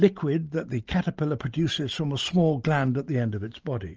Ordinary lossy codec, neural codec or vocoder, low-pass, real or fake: Opus, 24 kbps; none; 7.2 kHz; real